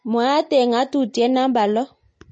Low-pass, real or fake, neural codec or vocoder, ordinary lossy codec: 9.9 kHz; real; none; MP3, 32 kbps